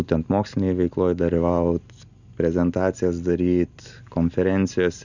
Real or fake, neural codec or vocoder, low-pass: real; none; 7.2 kHz